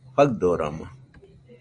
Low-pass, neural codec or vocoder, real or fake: 9.9 kHz; none; real